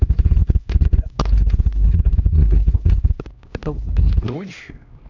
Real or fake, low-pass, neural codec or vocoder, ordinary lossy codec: fake; 7.2 kHz; codec, 16 kHz, 1 kbps, X-Codec, HuBERT features, trained on LibriSpeech; none